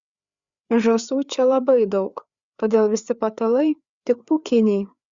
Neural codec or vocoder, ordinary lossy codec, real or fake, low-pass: codec, 16 kHz, 4 kbps, FreqCodec, larger model; Opus, 64 kbps; fake; 7.2 kHz